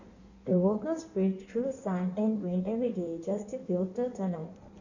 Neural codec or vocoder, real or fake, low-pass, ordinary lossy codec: codec, 16 kHz in and 24 kHz out, 1.1 kbps, FireRedTTS-2 codec; fake; 7.2 kHz; none